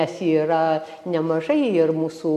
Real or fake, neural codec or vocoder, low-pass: real; none; 14.4 kHz